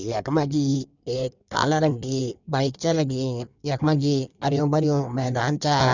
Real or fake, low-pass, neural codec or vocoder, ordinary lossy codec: fake; 7.2 kHz; codec, 16 kHz in and 24 kHz out, 1.1 kbps, FireRedTTS-2 codec; none